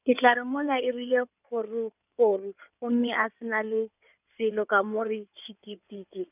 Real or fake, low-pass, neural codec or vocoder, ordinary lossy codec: fake; 3.6 kHz; codec, 16 kHz in and 24 kHz out, 2.2 kbps, FireRedTTS-2 codec; none